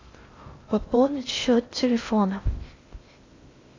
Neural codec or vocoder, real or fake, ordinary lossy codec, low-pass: codec, 16 kHz in and 24 kHz out, 0.6 kbps, FocalCodec, streaming, 2048 codes; fake; AAC, 32 kbps; 7.2 kHz